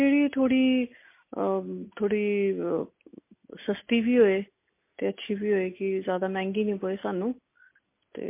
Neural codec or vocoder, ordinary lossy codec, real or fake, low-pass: none; MP3, 24 kbps; real; 3.6 kHz